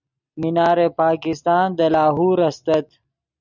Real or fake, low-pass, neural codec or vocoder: real; 7.2 kHz; none